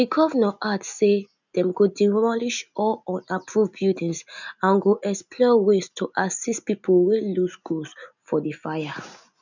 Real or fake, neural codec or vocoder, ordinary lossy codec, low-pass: real; none; none; 7.2 kHz